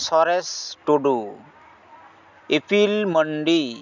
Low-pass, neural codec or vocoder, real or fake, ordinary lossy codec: 7.2 kHz; none; real; none